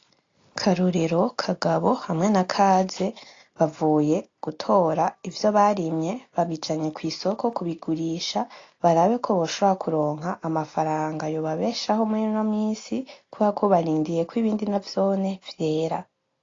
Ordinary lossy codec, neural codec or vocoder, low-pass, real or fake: AAC, 32 kbps; none; 7.2 kHz; real